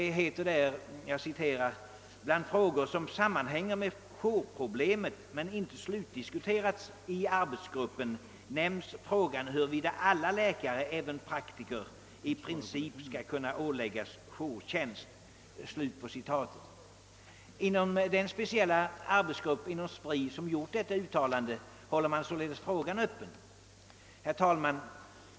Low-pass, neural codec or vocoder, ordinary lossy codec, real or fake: none; none; none; real